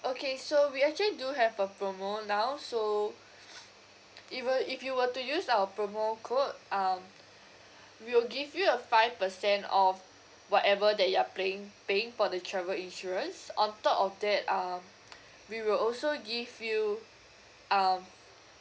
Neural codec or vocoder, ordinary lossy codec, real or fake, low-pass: none; none; real; none